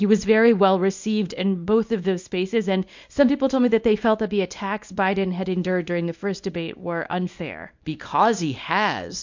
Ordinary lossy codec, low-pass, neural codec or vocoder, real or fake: MP3, 48 kbps; 7.2 kHz; codec, 24 kHz, 0.9 kbps, WavTokenizer, small release; fake